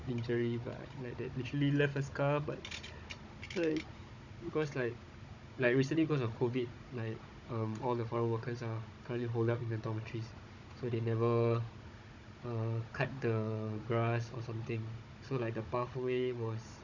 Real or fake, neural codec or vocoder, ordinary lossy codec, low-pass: fake; codec, 16 kHz, 16 kbps, FunCodec, trained on Chinese and English, 50 frames a second; none; 7.2 kHz